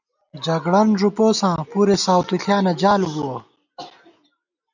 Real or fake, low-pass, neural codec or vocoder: real; 7.2 kHz; none